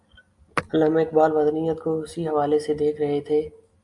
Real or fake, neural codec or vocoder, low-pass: real; none; 10.8 kHz